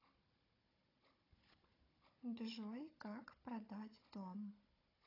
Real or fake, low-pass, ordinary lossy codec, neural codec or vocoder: fake; 5.4 kHz; AAC, 24 kbps; codec, 16 kHz, 16 kbps, FunCodec, trained on Chinese and English, 50 frames a second